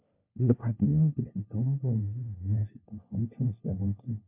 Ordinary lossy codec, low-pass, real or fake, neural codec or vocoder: MP3, 32 kbps; 3.6 kHz; fake; codec, 16 kHz, 1 kbps, FunCodec, trained on LibriTTS, 50 frames a second